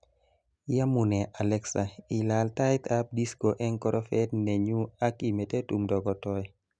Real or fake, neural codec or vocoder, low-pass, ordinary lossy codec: real; none; 9.9 kHz; none